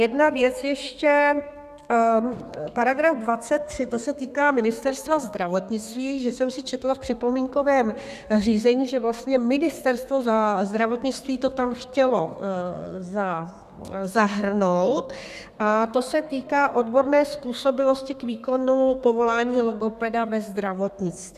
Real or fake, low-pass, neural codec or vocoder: fake; 14.4 kHz; codec, 32 kHz, 1.9 kbps, SNAC